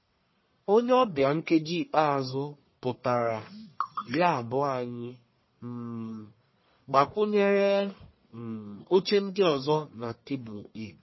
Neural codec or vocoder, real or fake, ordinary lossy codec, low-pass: codec, 44.1 kHz, 1.7 kbps, Pupu-Codec; fake; MP3, 24 kbps; 7.2 kHz